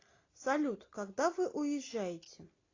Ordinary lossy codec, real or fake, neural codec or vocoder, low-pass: AAC, 32 kbps; real; none; 7.2 kHz